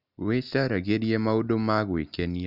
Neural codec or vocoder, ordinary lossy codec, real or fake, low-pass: none; none; real; 5.4 kHz